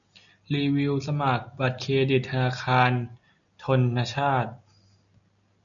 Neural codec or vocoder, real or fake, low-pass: none; real; 7.2 kHz